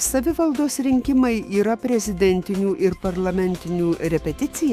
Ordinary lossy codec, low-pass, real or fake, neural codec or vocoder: Opus, 64 kbps; 14.4 kHz; fake; autoencoder, 48 kHz, 128 numbers a frame, DAC-VAE, trained on Japanese speech